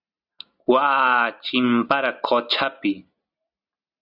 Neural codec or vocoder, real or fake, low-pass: none; real; 5.4 kHz